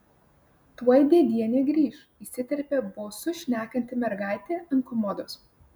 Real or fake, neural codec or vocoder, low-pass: real; none; 19.8 kHz